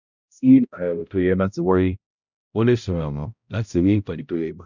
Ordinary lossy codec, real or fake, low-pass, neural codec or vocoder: none; fake; 7.2 kHz; codec, 16 kHz, 0.5 kbps, X-Codec, HuBERT features, trained on balanced general audio